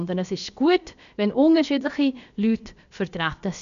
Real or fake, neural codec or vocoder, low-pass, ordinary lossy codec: fake; codec, 16 kHz, about 1 kbps, DyCAST, with the encoder's durations; 7.2 kHz; none